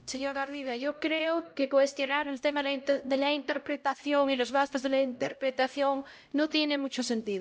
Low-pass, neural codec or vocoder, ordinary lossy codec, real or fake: none; codec, 16 kHz, 0.5 kbps, X-Codec, HuBERT features, trained on LibriSpeech; none; fake